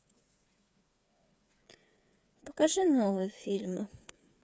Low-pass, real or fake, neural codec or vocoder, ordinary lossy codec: none; fake; codec, 16 kHz, 8 kbps, FreqCodec, smaller model; none